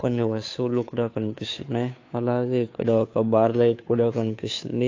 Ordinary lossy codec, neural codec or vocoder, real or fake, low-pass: AAC, 48 kbps; codec, 16 kHz, 2 kbps, FunCodec, trained on Chinese and English, 25 frames a second; fake; 7.2 kHz